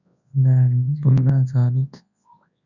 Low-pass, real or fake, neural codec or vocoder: 7.2 kHz; fake; codec, 24 kHz, 0.9 kbps, WavTokenizer, large speech release